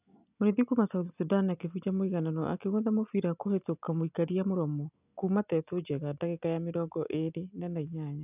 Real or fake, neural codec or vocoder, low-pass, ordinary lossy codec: real; none; 3.6 kHz; none